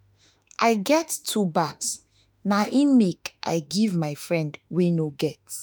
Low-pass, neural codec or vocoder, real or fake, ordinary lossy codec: none; autoencoder, 48 kHz, 32 numbers a frame, DAC-VAE, trained on Japanese speech; fake; none